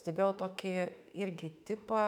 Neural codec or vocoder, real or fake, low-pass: autoencoder, 48 kHz, 32 numbers a frame, DAC-VAE, trained on Japanese speech; fake; 19.8 kHz